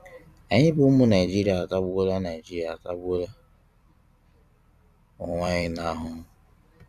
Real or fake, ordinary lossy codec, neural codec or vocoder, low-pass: fake; none; vocoder, 44.1 kHz, 128 mel bands every 256 samples, BigVGAN v2; 14.4 kHz